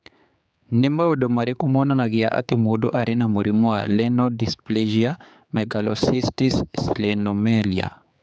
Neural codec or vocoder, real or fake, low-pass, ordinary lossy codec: codec, 16 kHz, 4 kbps, X-Codec, HuBERT features, trained on general audio; fake; none; none